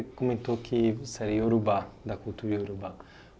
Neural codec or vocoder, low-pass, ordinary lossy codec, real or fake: none; none; none; real